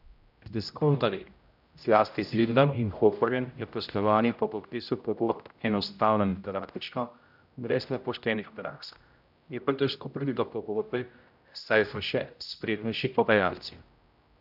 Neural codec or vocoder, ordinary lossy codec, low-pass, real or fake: codec, 16 kHz, 0.5 kbps, X-Codec, HuBERT features, trained on general audio; none; 5.4 kHz; fake